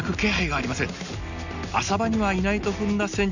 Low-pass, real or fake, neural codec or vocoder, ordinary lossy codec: 7.2 kHz; real; none; none